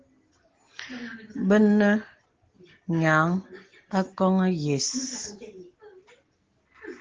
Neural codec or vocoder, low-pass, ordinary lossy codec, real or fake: none; 7.2 kHz; Opus, 16 kbps; real